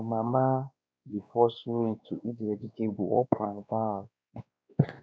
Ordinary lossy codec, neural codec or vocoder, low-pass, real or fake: none; codec, 16 kHz, 2 kbps, X-Codec, WavLM features, trained on Multilingual LibriSpeech; none; fake